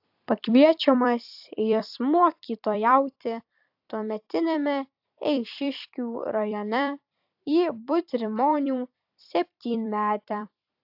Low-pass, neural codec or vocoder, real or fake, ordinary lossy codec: 5.4 kHz; vocoder, 44.1 kHz, 128 mel bands every 256 samples, BigVGAN v2; fake; AAC, 48 kbps